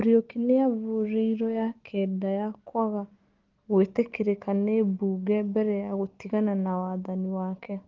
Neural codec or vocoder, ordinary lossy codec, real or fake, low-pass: none; Opus, 16 kbps; real; 7.2 kHz